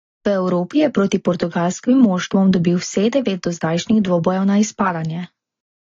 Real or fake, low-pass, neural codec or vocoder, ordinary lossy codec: real; 7.2 kHz; none; AAC, 32 kbps